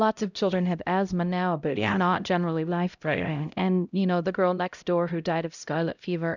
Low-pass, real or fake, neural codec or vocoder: 7.2 kHz; fake; codec, 16 kHz, 0.5 kbps, X-Codec, HuBERT features, trained on LibriSpeech